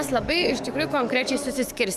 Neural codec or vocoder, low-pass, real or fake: codec, 44.1 kHz, 7.8 kbps, DAC; 14.4 kHz; fake